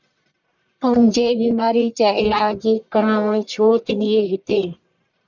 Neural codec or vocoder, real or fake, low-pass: codec, 44.1 kHz, 1.7 kbps, Pupu-Codec; fake; 7.2 kHz